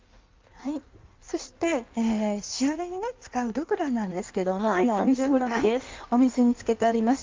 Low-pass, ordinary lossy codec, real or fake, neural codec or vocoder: 7.2 kHz; Opus, 24 kbps; fake; codec, 16 kHz in and 24 kHz out, 1.1 kbps, FireRedTTS-2 codec